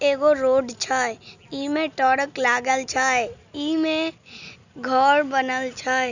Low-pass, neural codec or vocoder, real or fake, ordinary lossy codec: 7.2 kHz; none; real; none